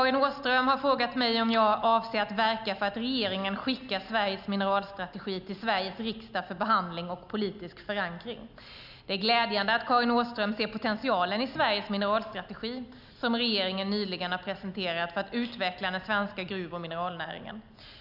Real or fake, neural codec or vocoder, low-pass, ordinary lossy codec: real; none; 5.4 kHz; none